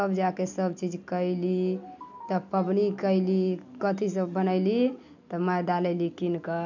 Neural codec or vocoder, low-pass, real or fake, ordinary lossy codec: none; 7.2 kHz; real; none